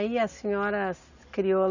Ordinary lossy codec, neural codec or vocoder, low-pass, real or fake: none; none; 7.2 kHz; real